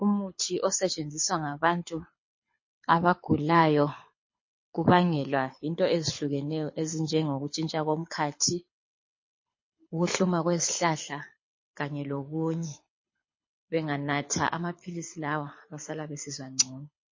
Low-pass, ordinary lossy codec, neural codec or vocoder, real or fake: 7.2 kHz; MP3, 32 kbps; codec, 24 kHz, 6 kbps, HILCodec; fake